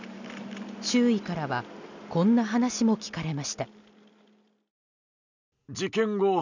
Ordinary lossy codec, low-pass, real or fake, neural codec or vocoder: none; 7.2 kHz; real; none